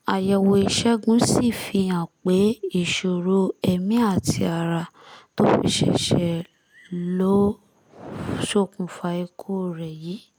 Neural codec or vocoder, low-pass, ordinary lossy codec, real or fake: none; none; none; real